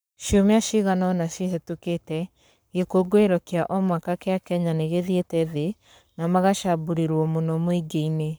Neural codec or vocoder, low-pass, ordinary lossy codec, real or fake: codec, 44.1 kHz, 7.8 kbps, Pupu-Codec; none; none; fake